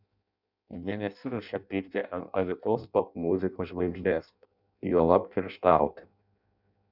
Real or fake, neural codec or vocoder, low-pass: fake; codec, 16 kHz in and 24 kHz out, 0.6 kbps, FireRedTTS-2 codec; 5.4 kHz